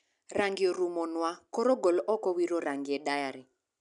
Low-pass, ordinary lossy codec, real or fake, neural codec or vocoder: 10.8 kHz; none; real; none